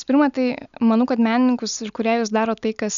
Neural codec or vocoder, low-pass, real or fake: none; 7.2 kHz; real